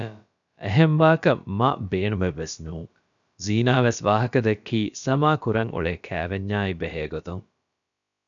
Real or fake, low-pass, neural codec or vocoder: fake; 7.2 kHz; codec, 16 kHz, about 1 kbps, DyCAST, with the encoder's durations